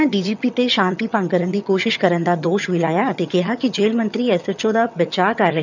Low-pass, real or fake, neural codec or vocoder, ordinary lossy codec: 7.2 kHz; fake; vocoder, 22.05 kHz, 80 mel bands, HiFi-GAN; none